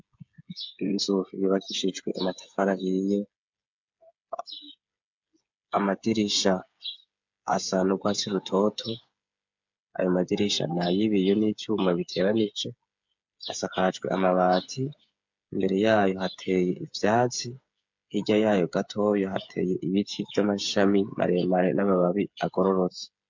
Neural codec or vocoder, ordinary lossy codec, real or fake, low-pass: codec, 16 kHz, 8 kbps, FreqCodec, smaller model; MP3, 64 kbps; fake; 7.2 kHz